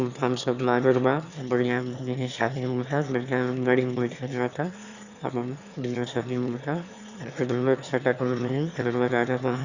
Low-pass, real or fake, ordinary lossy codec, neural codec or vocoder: 7.2 kHz; fake; Opus, 64 kbps; autoencoder, 22.05 kHz, a latent of 192 numbers a frame, VITS, trained on one speaker